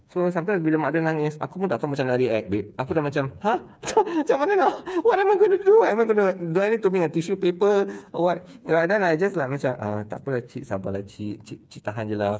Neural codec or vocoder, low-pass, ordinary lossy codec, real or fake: codec, 16 kHz, 4 kbps, FreqCodec, smaller model; none; none; fake